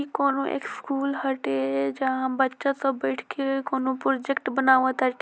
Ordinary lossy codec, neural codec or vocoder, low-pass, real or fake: none; none; none; real